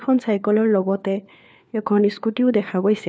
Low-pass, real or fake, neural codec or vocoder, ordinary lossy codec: none; fake; codec, 16 kHz, 4 kbps, FunCodec, trained on LibriTTS, 50 frames a second; none